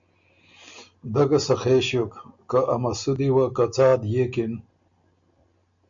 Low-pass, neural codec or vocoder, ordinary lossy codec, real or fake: 7.2 kHz; none; MP3, 64 kbps; real